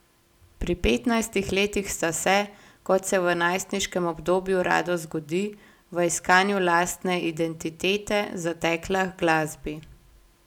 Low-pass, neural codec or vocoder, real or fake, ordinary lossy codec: 19.8 kHz; none; real; none